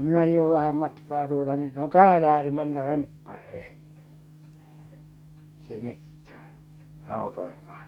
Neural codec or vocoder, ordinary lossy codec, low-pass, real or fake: codec, 44.1 kHz, 2.6 kbps, DAC; none; 19.8 kHz; fake